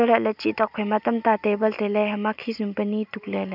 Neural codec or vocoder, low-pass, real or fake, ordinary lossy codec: none; 5.4 kHz; real; none